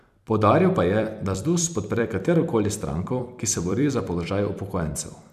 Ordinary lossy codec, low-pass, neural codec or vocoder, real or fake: none; 14.4 kHz; vocoder, 44.1 kHz, 128 mel bands every 256 samples, BigVGAN v2; fake